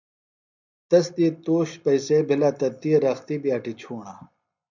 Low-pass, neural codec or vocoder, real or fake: 7.2 kHz; none; real